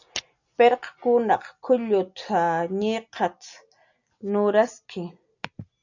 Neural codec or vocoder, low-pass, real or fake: none; 7.2 kHz; real